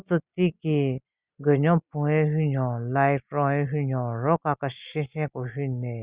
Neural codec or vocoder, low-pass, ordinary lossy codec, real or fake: none; 3.6 kHz; Opus, 64 kbps; real